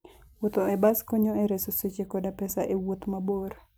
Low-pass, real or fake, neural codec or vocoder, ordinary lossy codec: none; fake; vocoder, 44.1 kHz, 128 mel bands every 256 samples, BigVGAN v2; none